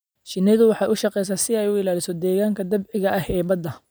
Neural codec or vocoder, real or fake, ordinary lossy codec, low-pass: none; real; none; none